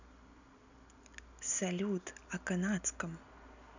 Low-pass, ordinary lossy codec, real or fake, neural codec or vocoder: 7.2 kHz; none; real; none